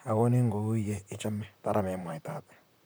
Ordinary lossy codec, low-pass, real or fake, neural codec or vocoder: none; none; fake; vocoder, 44.1 kHz, 128 mel bands, Pupu-Vocoder